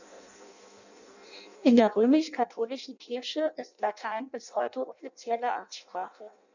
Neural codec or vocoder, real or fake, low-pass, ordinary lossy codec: codec, 16 kHz in and 24 kHz out, 0.6 kbps, FireRedTTS-2 codec; fake; 7.2 kHz; none